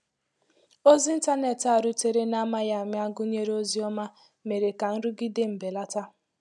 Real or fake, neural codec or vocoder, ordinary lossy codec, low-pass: real; none; none; none